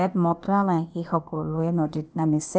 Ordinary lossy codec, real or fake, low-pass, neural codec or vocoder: none; fake; none; codec, 16 kHz, 0.8 kbps, ZipCodec